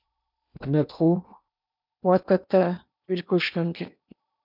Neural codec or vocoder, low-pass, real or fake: codec, 16 kHz in and 24 kHz out, 0.8 kbps, FocalCodec, streaming, 65536 codes; 5.4 kHz; fake